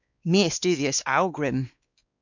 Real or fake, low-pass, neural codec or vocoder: fake; 7.2 kHz; codec, 16 kHz, 2 kbps, X-Codec, WavLM features, trained on Multilingual LibriSpeech